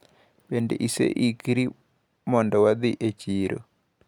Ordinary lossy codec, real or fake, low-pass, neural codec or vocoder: none; real; 19.8 kHz; none